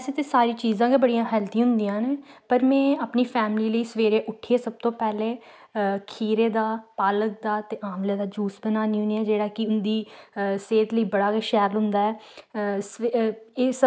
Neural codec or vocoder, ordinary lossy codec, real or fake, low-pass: none; none; real; none